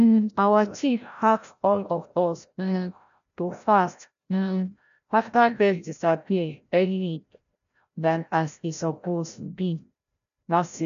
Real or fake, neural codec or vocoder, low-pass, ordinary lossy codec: fake; codec, 16 kHz, 0.5 kbps, FreqCodec, larger model; 7.2 kHz; none